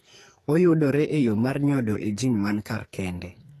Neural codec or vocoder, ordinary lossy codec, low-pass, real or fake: codec, 44.1 kHz, 2.6 kbps, SNAC; AAC, 48 kbps; 14.4 kHz; fake